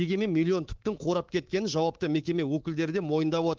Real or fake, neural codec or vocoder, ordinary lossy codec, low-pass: fake; codec, 24 kHz, 3.1 kbps, DualCodec; Opus, 16 kbps; 7.2 kHz